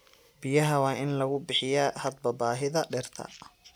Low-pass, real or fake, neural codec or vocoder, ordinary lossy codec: none; real; none; none